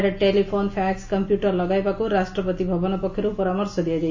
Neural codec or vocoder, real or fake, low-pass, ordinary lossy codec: none; real; 7.2 kHz; AAC, 48 kbps